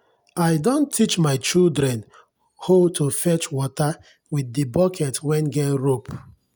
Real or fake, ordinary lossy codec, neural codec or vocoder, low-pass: real; none; none; none